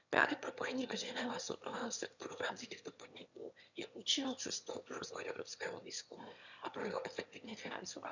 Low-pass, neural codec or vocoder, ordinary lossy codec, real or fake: 7.2 kHz; autoencoder, 22.05 kHz, a latent of 192 numbers a frame, VITS, trained on one speaker; none; fake